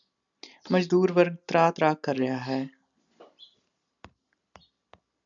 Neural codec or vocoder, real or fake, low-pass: none; real; 7.2 kHz